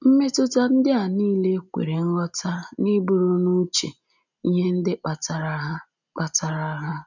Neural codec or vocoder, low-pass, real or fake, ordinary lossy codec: none; 7.2 kHz; real; none